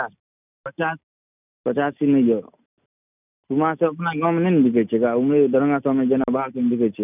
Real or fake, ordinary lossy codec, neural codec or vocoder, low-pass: real; none; none; 3.6 kHz